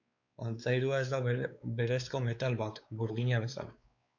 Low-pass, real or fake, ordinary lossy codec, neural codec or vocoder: 7.2 kHz; fake; MP3, 64 kbps; codec, 16 kHz, 4 kbps, X-Codec, WavLM features, trained on Multilingual LibriSpeech